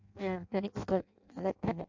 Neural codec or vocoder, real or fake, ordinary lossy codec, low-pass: codec, 16 kHz in and 24 kHz out, 0.6 kbps, FireRedTTS-2 codec; fake; MP3, 64 kbps; 7.2 kHz